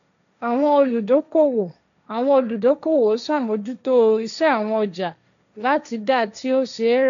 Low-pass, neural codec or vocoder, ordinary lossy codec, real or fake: 7.2 kHz; codec, 16 kHz, 1.1 kbps, Voila-Tokenizer; none; fake